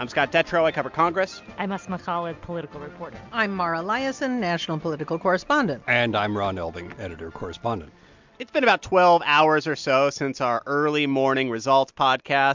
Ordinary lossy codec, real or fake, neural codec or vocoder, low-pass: MP3, 64 kbps; real; none; 7.2 kHz